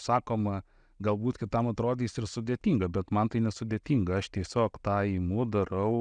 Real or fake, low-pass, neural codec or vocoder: real; 9.9 kHz; none